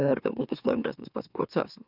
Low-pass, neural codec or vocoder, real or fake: 5.4 kHz; autoencoder, 44.1 kHz, a latent of 192 numbers a frame, MeloTTS; fake